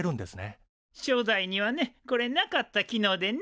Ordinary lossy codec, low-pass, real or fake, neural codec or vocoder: none; none; real; none